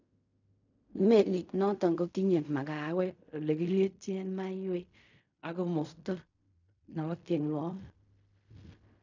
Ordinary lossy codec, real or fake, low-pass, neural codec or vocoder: none; fake; 7.2 kHz; codec, 16 kHz in and 24 kHz out, 0.4 kbps, LongCat-Audio-Codec, fine tuned four codebook decoder